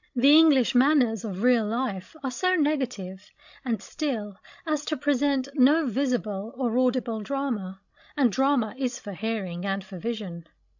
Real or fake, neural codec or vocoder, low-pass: fake; codec, 16 kHz, 16 kbps, FreqCodec, larger model; 7.2 kHz